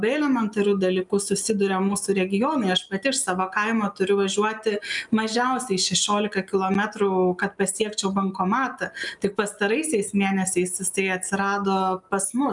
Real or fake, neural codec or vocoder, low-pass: real; none; 10.8 kHz